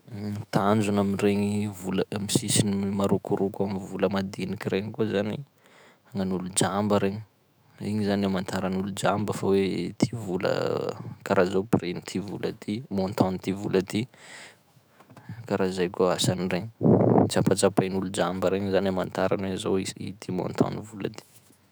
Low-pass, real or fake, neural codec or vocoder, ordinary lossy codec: none; fake; autoencoder, 48 kHz, 128 numbers a frame, DAC-VAE, trained on Japanese speech; none